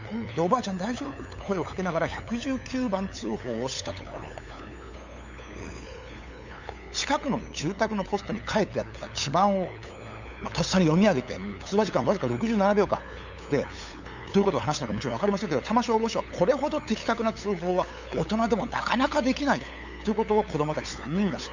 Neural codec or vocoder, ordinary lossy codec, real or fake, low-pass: codec, 16 kHz, 8 kbps, FunCodec, trained on LibriTTS, 25 frames a second; Opus, 64 kbps; fake; 7.2 kHz